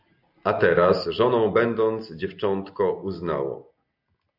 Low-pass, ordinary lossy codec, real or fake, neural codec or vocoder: 5.4 kHz; AAC, 48 kbps; real; none